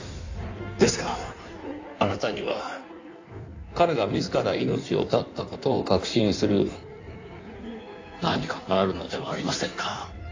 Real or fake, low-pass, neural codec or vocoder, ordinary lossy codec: fake; 7.2 kHz; codec, 16 kHz in and 24 kHz out, 1.1 kbps, FireRedTTS-2 codec; AAC, 48 kbps